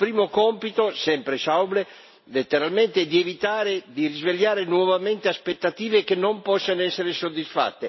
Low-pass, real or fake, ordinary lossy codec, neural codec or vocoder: 7.2 kHz; real; MP3, 24 kbps; none